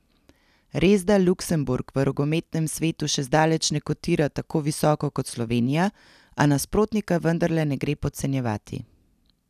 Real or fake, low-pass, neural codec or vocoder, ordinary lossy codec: real; 14.4 kHz; none; none